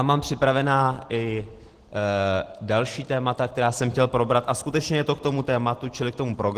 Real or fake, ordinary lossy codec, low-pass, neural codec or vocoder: real; Opus, 16 kbps; 14.4 kHz; none